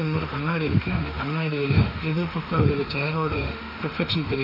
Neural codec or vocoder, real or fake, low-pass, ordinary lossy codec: autoencoder, 48 kHz, 32 numbers a frame, DAC-VAE, trained on Japanese speech; fake; 5.4 kHz; none